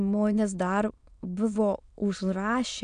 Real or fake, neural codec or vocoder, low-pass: fake; autoencoder, 22.05 kHz, a latent of 192 numbers a frame, VITS, trained on many speakers; 9.9 kHz